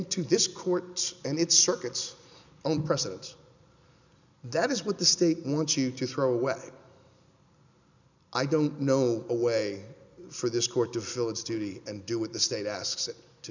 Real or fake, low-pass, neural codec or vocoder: real; 7.2 kHz; none